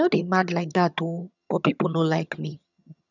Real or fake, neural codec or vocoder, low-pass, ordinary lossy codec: fake; vocoder, 22.05 kHz, 80 mel bands, HiFi-GAN; 7.2 kHz; none